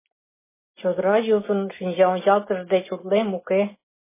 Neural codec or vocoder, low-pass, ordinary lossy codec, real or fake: none; 3.6 kHz; MP3, 16 kbps; real